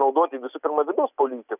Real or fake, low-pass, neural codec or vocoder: real; 3.6 kHz; none